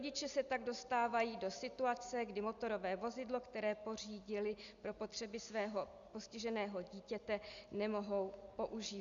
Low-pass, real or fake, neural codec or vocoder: 7.2 kHz; real; none